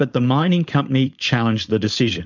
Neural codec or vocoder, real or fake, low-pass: codec, 16 kHz, 4.8 kbps, FACodec; fake; 7.2 kHz